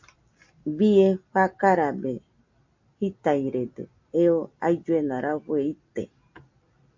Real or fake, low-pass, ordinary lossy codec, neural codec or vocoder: real; 7.2 kHz; MP3, 64 kbps; none